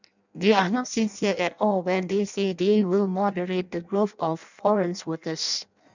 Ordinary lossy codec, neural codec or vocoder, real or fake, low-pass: none; codec, 16 kHz in and 24 kHz out, 0.6 kbps, FireRedTTS-2 codec; fake; 7.2 kHz